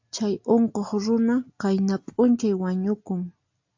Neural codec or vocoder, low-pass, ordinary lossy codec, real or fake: none; 7.2 kHz; AAC, 48 kbps; real